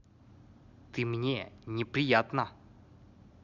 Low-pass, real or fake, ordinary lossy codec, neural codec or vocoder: 7.2 kHz; real; none; none